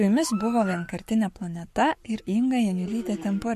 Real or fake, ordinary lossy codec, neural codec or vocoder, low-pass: fake; MP3, 64 kbps; codec, 44.1 kHz, 7.8 kbps, Pupu-Codec; 14.4 kHz